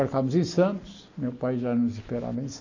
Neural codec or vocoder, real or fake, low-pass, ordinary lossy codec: none; real; 7.2 kHz; AAC, 48 kbps